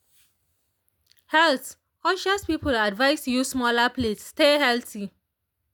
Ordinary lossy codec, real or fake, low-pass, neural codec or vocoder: none; real; none; none